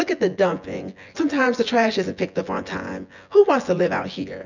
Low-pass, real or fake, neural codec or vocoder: 7.2 kHz; fake; vocoder, 24 kHz, 100 mel bands, Vocos